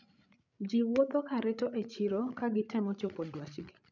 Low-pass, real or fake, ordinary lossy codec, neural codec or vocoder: 7.2 kHz; fake; none; codec, 16 kHz, 16 kbps, FreqCodec, larger model